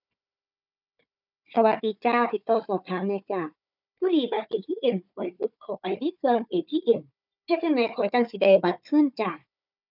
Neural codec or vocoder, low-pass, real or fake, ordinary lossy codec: codec, 16 kHz, 4 kbps, FunCodec, trained on Chinese and English, 50 frames a second; 5.4 kHz; fake; none